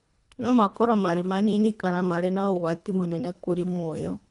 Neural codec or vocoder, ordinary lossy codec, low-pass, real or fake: codec, 24 kHz, 1.5 kbps, HILCodec; none; 10.8 kHz; fake